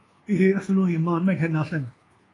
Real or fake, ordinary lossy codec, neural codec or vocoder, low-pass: fake; AAC, 32 kbps; codec, 24 kHz, 1.2 kbps, DualCodec; 10.8 kHz